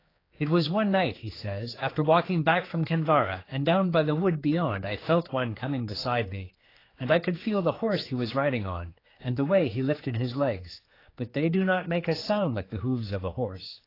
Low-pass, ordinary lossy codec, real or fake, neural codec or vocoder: 5.4 kHz; AAC, 24 kbps; fake; codec, 16 kHz, 4 kbps, X-Codec, HuBERT features, trained on general audio